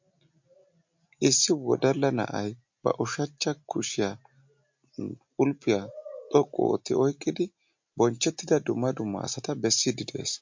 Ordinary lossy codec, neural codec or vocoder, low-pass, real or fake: MP3, 48 kbps; none; 7.2 kHz; real